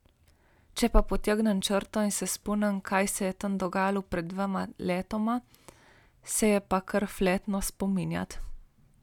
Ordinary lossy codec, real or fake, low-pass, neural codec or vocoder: none; real; 19.8 kHz; none